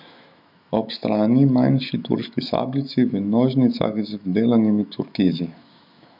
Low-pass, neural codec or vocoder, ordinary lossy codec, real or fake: 5.4 kHz; autoencoder, 48 kHz, 128 numbers a frame, DAC-VAE, trained on Japanese speech; none; fake